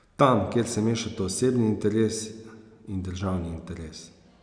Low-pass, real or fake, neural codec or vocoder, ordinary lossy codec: 9.9 kHz; real; none; none